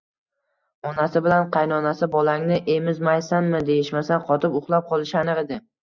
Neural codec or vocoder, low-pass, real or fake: none; 7.2 kHz; real